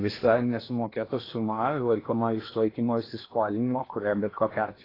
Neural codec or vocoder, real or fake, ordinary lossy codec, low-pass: codec, 16 kHz in and 24 kHz out, 0.8 kbps, FocalCodec, streaming, 65536 codes; fake; AAC, 24 kbps; 5.4 kHz